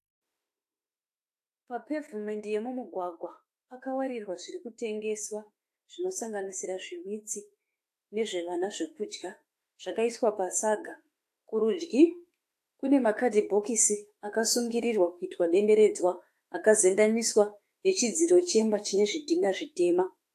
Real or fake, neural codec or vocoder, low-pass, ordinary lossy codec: fake; autoencoder, 48 kHz, 32 numbers a frame, DAC-VAE, trained on Japanese speech; 14.4 kHz; AAC, 64 kbps